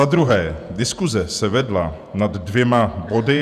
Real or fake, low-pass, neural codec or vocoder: fake; 14.4 kHz; vocoder, 48 kHz, 128 mel bands, Vocos